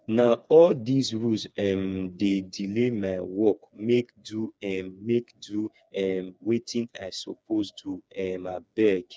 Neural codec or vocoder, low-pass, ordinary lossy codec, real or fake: codec, 16 kHz, 4 kbps, FreqCodec, smaller model; none; none; fake